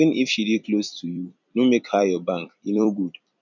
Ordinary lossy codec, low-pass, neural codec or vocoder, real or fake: none; 7.2 kHz; vocoder, 44.1 kHz, 128 mel bands every 256 samples, BigVGAN v2; fake